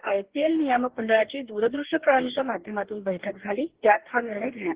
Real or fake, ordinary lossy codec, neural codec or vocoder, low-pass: fake; Opus, 16 kbps; codec, 44.1 kHz, 2.6 kbps, DAC; 3.6 kHz